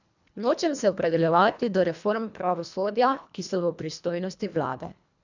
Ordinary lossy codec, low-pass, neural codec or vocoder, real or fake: none; 7.2 kHz; codec, 24 kHz, 1.5 kbps, HILCodec; fake